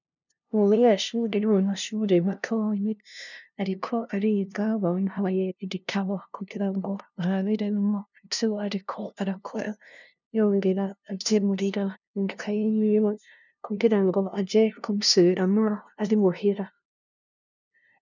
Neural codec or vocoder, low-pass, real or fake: codec, 16 kHz, 0.5 kbps, FunCodec, trained on LibriTTS, 25 frames a second; 7.2 kHz; fake